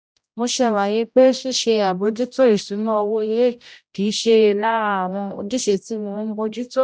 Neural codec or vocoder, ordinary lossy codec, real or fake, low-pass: codec, 16 kHz, 0.5 kbps, X-Codec, HuBERT features, trained on general audio; none; fake; none